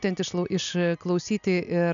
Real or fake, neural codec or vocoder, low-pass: real; none; 7.2 kHz